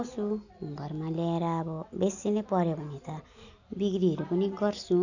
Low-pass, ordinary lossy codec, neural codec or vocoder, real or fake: 7.2 kHz; none; none; real